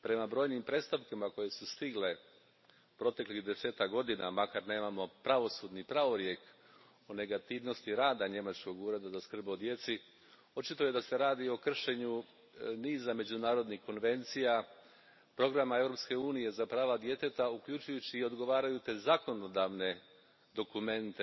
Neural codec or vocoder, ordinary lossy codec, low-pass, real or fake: none; MP3, 24 kbps; 7.2 kHz; real